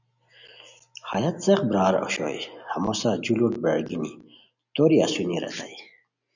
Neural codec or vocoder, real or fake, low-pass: none; real; 7.2 kHz